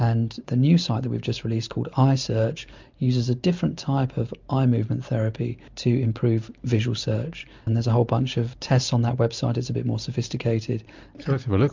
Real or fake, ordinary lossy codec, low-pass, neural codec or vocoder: real; MP3, 64 kbps; 7.2 kHz; none